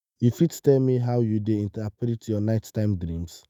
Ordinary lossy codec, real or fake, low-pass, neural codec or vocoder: none; fake; none; autoencoder, 48 kHz, 128 numbers a frame, DAC-VAE, trained on Japanese speech